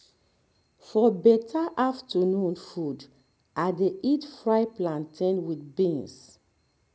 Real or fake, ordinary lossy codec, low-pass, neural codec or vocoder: real; none; none; none